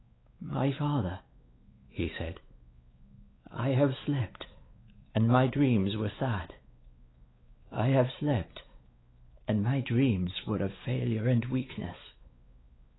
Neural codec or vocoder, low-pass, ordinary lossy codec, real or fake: codec, 16 kHz, 4 kbps, X-Codec, WavLM features, trained on Multilingual LibriSpeech; 7.2 kHz; AAC, 16 kbps; fake